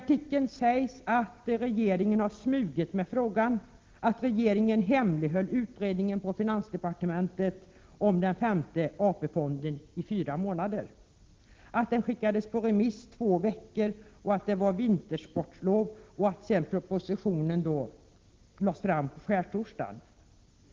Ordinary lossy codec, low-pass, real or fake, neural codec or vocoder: Opus, 16 kbps; 7.2 kHz; real; none